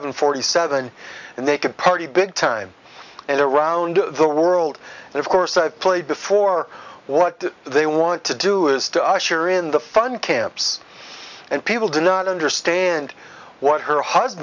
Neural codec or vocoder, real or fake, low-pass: none; real; 7.2 kHz